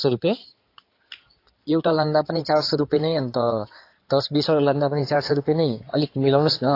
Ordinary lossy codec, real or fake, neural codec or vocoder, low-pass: AAC, 32 kbps; fake; codec, 16 kHz in and 24 kHz out, 2.2 kbps, FireRedTTS-2 codec; 5.4 kHz